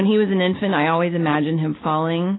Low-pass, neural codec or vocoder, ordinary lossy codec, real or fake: 7.2 kHz; none; AAC, 16 kbps; real